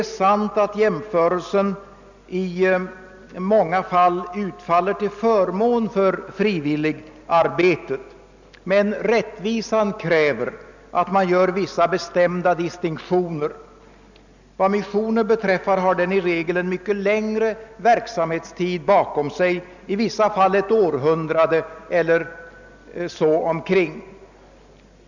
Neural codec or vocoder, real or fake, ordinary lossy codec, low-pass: none; real; none; 7.2 kHz